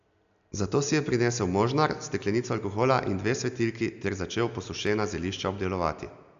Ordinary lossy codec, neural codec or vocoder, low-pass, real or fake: AAC, 96 kbps; none; 7.2 kHz; real